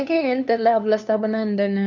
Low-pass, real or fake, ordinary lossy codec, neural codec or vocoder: 7.2 kHz; fake; none; codec, 16 kHz, 2 kbps, FunCodec, trained on LibriTTS, 25 frames a second